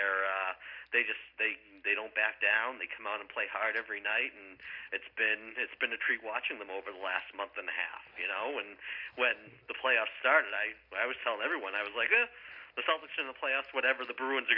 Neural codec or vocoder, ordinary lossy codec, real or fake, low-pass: none; MP3, 48 kbps; real; 5.4 kHz